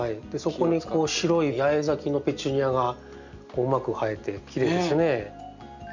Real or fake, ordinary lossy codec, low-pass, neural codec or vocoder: real; none; 7.2 kHz; none